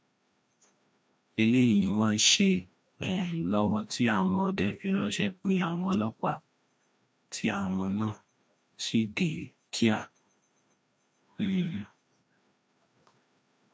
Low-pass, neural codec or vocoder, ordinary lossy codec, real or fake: none; codec, 16 kHz, 1 kbps, FreqCodec, larger model; none; fake